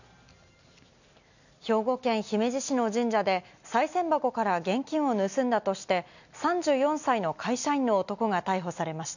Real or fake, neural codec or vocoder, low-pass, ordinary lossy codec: real; none; 7.2 kHz; none